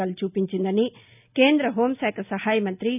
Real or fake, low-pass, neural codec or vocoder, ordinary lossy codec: real; 3.6 kHz; none; none